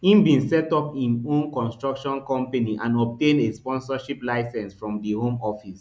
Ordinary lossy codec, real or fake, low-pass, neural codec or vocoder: none; real; none; none